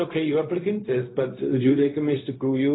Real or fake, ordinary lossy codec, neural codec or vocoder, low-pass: fake; AAC, 16 kbps; codec, 16 kHz, 0.4 kbps, LongCat-Audio-Codec; 7.2 kHz